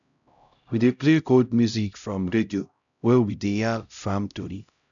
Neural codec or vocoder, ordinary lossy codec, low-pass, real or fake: codec, 16 kHz, 0.5 kbps, X-Codec, HuBERT features, trained on LibriSpeech; none; 7.2 kHz; fake